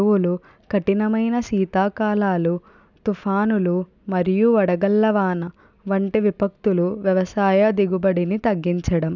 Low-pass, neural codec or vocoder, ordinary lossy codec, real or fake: 7.2 kHz; none; none; real